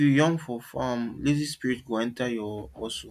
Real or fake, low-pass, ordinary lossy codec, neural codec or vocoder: real; 14.4 kHz; MP3, 96 kbps; none